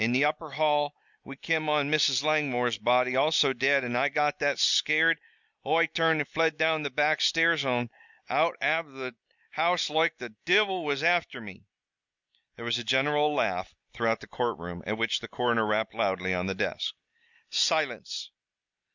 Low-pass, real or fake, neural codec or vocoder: 7.2 kHz; real; none